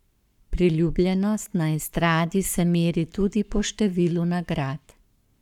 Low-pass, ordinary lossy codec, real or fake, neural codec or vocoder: 19.8 kHz; none; fake; codec, 44.1 kHz, 7.8 kbps, Pupu-Codec